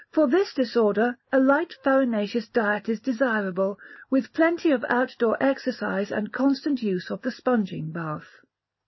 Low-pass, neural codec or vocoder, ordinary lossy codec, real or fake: 7.2 kHz; none; MP3, 24 kbps; real